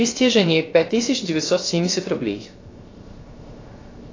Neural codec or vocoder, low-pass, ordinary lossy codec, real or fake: codec, 16 kHz, 0.3 kbps, FocalCodec; 7.2 kHz; AAC, 32 kbps; fake